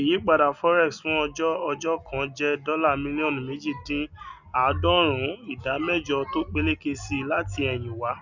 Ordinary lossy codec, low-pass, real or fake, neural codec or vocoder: none; 7.2 kHz; real; none